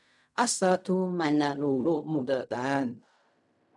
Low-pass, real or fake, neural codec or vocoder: 10.8 kHz; fake; codec, 16 kHz in and 24 kHz out, 0.4 kbps, LongCat-Audio-Codec, fine tuned four codebook decoder